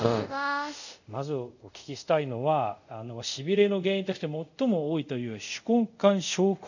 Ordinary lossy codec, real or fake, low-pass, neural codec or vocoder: none; fake; 7.2 kHz; codec, 24 kHz, 0.5 kbps, DualCodec